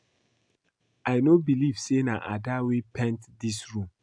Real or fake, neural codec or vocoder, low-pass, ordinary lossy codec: real; none; 9.9 kHz; AAC, 64 kbps